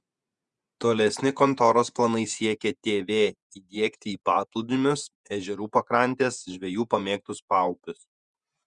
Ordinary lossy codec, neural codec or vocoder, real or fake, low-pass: Opus, 64 kbps; vocoder, 48 kHz, 128 mel bands, Vocos; fake; 10.8 kHz